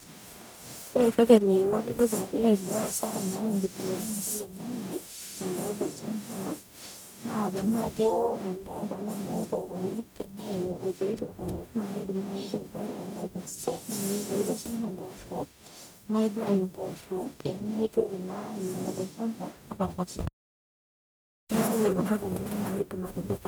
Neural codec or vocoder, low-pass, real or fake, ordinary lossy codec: codec, 44.1 kHz, 0.9 kbps, DAC; none; fake; none